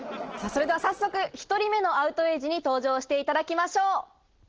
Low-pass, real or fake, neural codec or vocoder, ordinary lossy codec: 7.2 kHz; real; none; Opus, 16 kbps